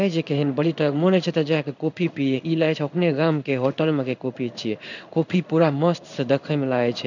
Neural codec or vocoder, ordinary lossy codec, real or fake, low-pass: codec, 16 kHz in and 24 kHz out, 1 kbps, XY-Tokenizer; none; fake; 7.2 kHz